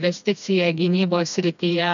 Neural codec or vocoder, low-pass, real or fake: codec, 16 kHz, 2 kbps, FreqCodec, smaller model; 7.2 kHz; fake